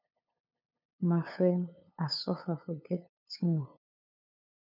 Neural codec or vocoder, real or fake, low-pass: codec, 16 kHz, 8 kbps, FunCodec, trained on LibriTTS, 25 frames a second; fake; 5.4 kHz